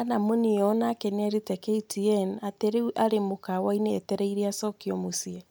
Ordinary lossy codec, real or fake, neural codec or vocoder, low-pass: none; real; none; none